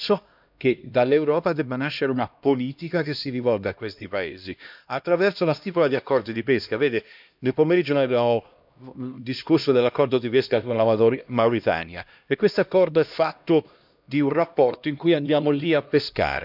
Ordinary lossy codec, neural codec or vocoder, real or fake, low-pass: none; codec, 16 kHz, 1 kbps, X-Codec, HuBERT features, trained on LibriSpeech; fake; 5.4 kHz